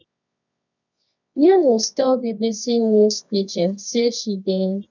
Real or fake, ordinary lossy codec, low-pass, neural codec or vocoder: fake; none; 7.2 kHz; codec, 24 kHz, 0.9 kbps, WavTokenizer, medium music audio release